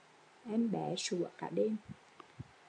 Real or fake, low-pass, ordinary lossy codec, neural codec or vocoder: real; 9.9 kHz; AAC, 64 kbps; none